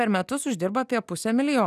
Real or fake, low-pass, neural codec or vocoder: real; 14.4 kHz; none